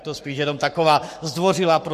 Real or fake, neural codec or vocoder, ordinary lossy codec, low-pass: fake; codec, 44.1 kHz, 7.8 kbps, Pupu-Codec; MP3, 64 kbps; 14.4 kHz